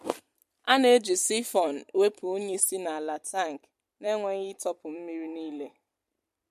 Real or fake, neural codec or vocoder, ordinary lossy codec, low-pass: fake; vocoder, 44.1 kHz, 128 mel bands every 256 samples, BigVGAN v2; MP3, 64 kbps; 14.4 kHz